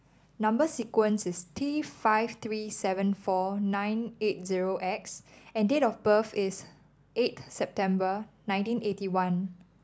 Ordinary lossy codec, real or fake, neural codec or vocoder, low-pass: none; real; none; none